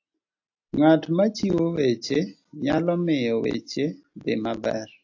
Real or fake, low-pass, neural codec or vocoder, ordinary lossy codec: real; 7.2 kHz; none; AAC, 48 kbps